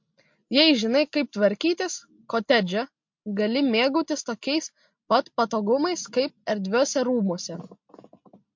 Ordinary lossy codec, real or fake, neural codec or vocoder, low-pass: MP3, 48 kbps; real; none; 7.2 kHz